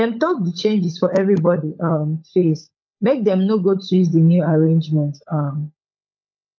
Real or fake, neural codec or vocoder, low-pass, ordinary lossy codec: fake; codec, 16 kHz, 16 kbps, FunCodec, trained on LibriTTS, 50 frames a second; 7.2 kHz; MP3, 48 kbps